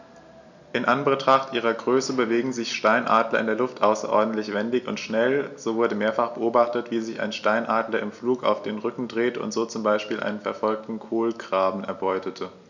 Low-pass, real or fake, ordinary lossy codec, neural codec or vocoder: 7.2 kHz; real; none; none